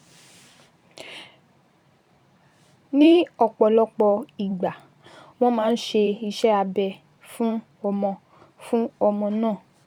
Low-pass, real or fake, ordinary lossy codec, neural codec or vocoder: 19.8 kHz; fake; none; vocoder, 44.1 kHz, 128 mel bands every 512 samples, BigVGAN v2